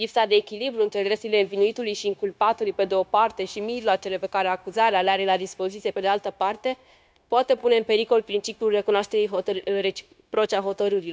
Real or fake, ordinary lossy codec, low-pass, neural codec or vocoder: fake; none; none; codec, 16 kHz, 0.9 kbps, LongCat-Audio-Codec